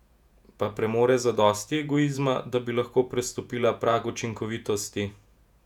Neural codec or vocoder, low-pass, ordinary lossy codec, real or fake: vocoder, 48 kHz, 128 mel bands, Vocos; 19.8 kHz; none; fake